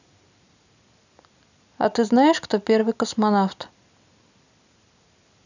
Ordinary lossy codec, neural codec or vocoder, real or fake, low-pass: none; none; real; 7.2 kHz